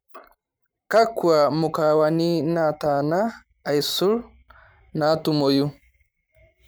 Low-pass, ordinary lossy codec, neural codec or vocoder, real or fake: none; none; vocoder, 44.1 kHz, 128 mel bands every 512 samples, BigVGAN v2; fake